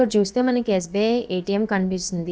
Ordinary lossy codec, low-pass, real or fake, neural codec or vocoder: none; none; fake; codec, 16 kHz, about 1 kbps, DyCAST, with the encoder's durations